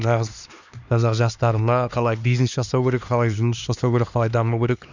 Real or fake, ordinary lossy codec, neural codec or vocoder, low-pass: fake; none; codec, 16 kHz, 2 kbps, X-Codec, HuBERT features, trained on LibriSpeech; 7.2 kHz